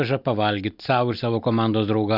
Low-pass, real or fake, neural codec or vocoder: 5.4 kHz; real; none